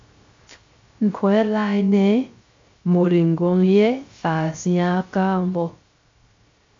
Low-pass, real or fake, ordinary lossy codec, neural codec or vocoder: 7.2 kHz; fake; MP3, 48 kbps; codec, 16 kHz, 0.3 kbps, FocalCodec